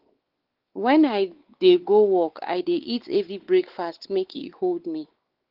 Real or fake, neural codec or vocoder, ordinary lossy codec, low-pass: fake; codec, 16 kHz, 4 kbps, X-Codec, WavLM features, trained on Multilingual LibriSpeech; Opus, 16 kbps; 5.4 kHz